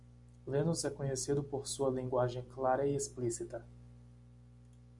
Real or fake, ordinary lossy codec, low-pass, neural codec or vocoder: real; MP3, 96 kbps; 10.8 kHz; none